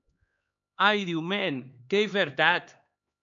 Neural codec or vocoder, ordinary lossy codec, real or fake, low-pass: codec, 16 kHz, 2 kbps, X-Codec, HuBERT features, trained on LibriSpeech; AAC, 64 kbps; fake; 7.2 kHz